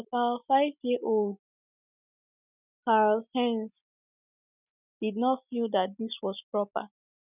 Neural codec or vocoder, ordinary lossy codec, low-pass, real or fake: none; none; 3.6 kHz; real